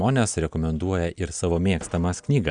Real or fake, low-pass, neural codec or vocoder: real; 9.9 kHz; none